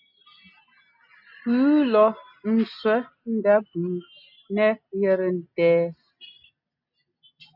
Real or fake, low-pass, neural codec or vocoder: real; 5.4 kHz; none